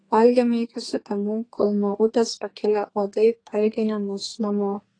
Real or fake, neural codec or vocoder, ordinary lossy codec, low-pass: fake; codec, 32 kHz, 1.9 kbps, SNAC; AAC, 32 kbps; 9.9 kHz